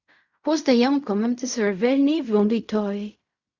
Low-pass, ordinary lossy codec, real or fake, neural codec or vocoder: 7.2 kHz; Opus, 64 kbps; fake; codec, 16 kHz in and 24 kHz out, 0.4 kbps, LongCat-Audio-Codec, fine tuned four codebook decoder